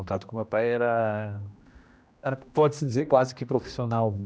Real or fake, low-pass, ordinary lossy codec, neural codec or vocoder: fake; none; none; codec, 16 kHz, 1 kbps, X-Codec, HuBERT features, trained on general audio